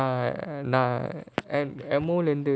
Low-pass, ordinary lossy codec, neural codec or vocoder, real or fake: none; none; none; real